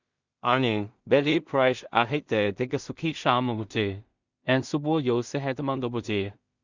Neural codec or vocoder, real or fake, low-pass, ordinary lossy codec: codec, 16 kHz in and 24 kHz out, 0.4 kbps, LongCat-Audio-Codec, two codebook decoder; fake; 7.2 kHz; Opus, 64 kbps